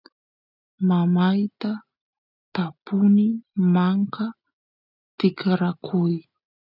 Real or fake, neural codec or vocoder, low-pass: real; none; 5.4 kHz